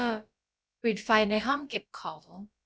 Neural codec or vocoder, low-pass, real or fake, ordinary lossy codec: codec, 16 kHz, about 1 kbps, DyCAST, with the encoder's durations; none; fake; none